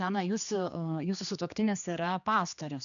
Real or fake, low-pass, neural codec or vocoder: fake; 7.2 kHz; codec, 16 kHz, 2 kbps, X-Codec, HuBERT features, trained on general audio